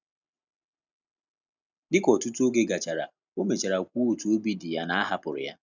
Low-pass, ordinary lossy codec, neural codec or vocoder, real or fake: 7.2 kHz; none; none; real